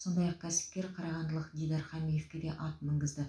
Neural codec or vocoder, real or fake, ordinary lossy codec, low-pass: none; real; none; none